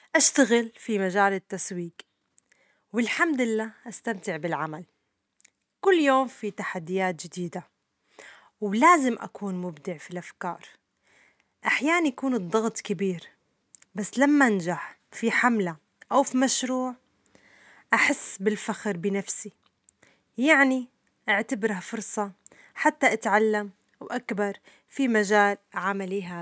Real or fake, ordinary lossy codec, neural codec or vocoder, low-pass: real; none; none; none